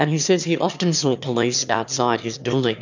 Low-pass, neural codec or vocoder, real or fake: 7.2 kHz; autoencoder, 22.05 kHz, a latent of 192 numbers a frame, VITS, trained on one speaker; fake